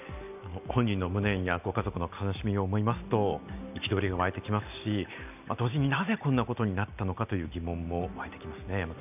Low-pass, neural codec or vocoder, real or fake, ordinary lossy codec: 3.6 kHz; none; real; none